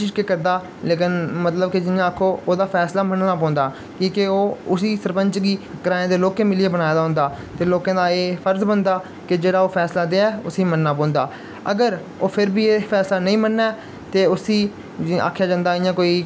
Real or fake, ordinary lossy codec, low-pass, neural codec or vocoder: real; none; none; none